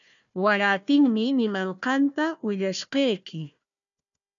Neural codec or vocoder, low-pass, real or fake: codec, 16 kHz, 1 kbps, FunCodec, trained on Chinese and English, 50 frames a second; 7.2 kHz; fake